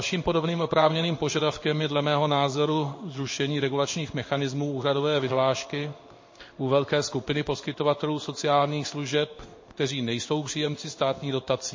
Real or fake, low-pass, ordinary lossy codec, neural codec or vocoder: fake; 7.2 kHz; MP3, 32 kbps; codec, 16 kHz in and 24 kHz out, 1 kbps, XY-Tokenizer